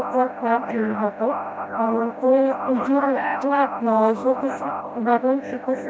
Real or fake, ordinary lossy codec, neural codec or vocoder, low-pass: fake; none; codec, 16 kHz, 0.5 kbps, FreqCodec, smaller model; none